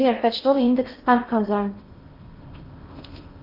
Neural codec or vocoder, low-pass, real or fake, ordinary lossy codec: codec, 16 kHz in and 24 kHz out, 0.8 kbps, FocalCodec, streaming, 65536 codes; 5.4 kHz; fake; Opus, 32 kbps